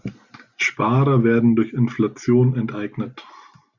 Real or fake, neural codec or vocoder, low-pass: real; none; 7.2 kHz